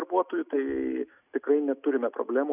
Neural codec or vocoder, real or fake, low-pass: none; real; 3.6 kHz